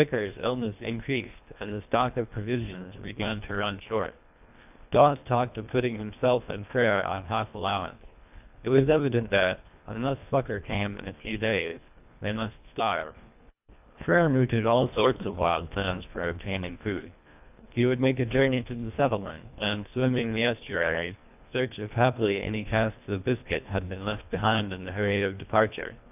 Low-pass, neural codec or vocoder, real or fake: 3.6 kHz; codec, 24 kHz, 1.5 kbps, HILCodec; fake